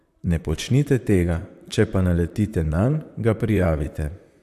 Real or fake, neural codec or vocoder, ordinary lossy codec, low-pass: fake; vocoder, 44.1 kHz, 128 mel bands, Pupu-Vocoder; AAC, 96 kbps; 14.4 kHz